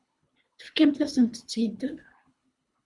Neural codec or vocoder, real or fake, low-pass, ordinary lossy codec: codec, 24 kHz, 3 kbps, HILCodec; fake; 10.8 kHz; Opus, 64 kbps